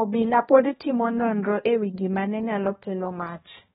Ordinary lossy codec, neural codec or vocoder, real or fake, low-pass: AAC, 16 kbps; codec, 16 kHz, 1 kbps, X-Codec, HuBERT features, trained on balanced general audio; fake; 7.2 kHz